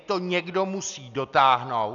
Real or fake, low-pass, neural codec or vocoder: real; 7.2 kHz; none